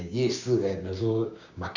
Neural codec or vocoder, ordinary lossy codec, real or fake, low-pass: codec, 16 kHz, 6 kbps, DAC; Opus, 64 kbps; fake; 7.2 kHz